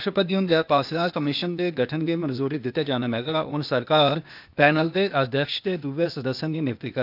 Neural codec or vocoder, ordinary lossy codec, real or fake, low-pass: codec, 16 kHz, 0.8 kbps, ZipCodec; none; fake; 5.4 kHz